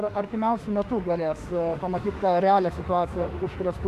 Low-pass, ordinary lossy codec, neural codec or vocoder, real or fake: 14.4 kHz; AAC, 96 kbps; autoencoder, 48 kHz, 32 numbers a frame, DAC-VAE, trained on Japanese speech; fake